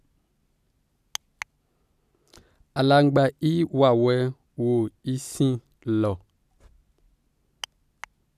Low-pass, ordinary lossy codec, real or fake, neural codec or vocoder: 14.4 kHz; none; real; none